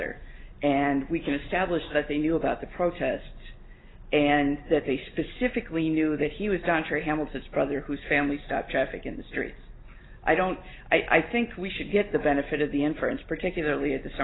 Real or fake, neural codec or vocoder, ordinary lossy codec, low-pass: real; none; AAC, 16 kbps; 7.2 kHz